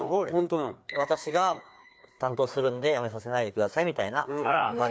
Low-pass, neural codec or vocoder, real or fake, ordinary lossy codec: none; codec, 16 kHz, 2 kbps, FreqCodec, larger model; fake; none